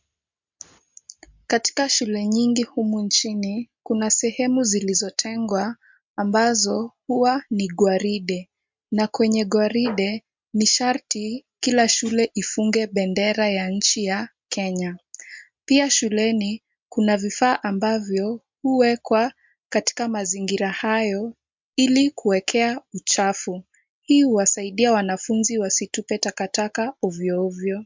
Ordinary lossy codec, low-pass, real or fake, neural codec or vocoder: MP3, 64 kbps; 7.2 kHz; real; none